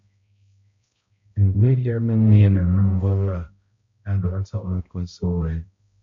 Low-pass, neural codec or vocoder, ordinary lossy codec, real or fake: 7.2 kHz; codec, 16 kHz, 0.5 kbps, X-Codec, HuBERT features, trained on balanced general audio; MP3, 48 kbps; fake